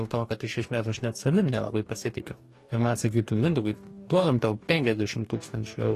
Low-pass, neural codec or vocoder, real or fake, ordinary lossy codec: 14.4 kHz; codec, 44.1 kHz, 2.6 kbps, DAC; fake; AAC, 48 kbps